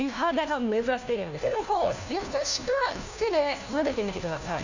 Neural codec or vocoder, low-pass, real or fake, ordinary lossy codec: codec, 16 kHz, 1 kbps, FunCodec, trained on LibriTTS, 50 frames a second; 7.2 kHz; fake; none